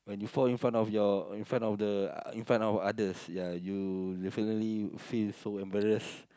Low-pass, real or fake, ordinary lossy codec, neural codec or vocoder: none; real; none; none